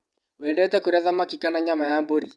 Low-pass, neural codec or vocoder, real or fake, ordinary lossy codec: none; vocoder, 22.05 kHz, 80 mel bands, WaveNeXt; fake; none